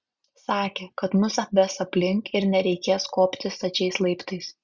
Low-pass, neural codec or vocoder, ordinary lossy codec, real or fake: 7.2 kHz; none; Opus, 64 kbps; real